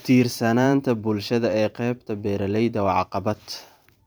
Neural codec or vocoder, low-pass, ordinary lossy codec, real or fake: vocoder, 44.1 kHz, 128 mel bands every 512 samples, BigVGAN v2; none; none; fake